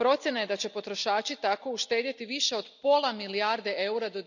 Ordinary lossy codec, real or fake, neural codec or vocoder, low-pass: none; real; none; 7.2 kHz